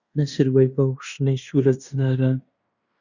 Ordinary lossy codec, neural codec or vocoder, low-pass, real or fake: Opus, 64 kbps; codec, 16 kHz in and 24 kHz out, 0.9 kbps, LongCat-Audio-Codec, fine tuned four codebook decoder; 7.2 kHz; fake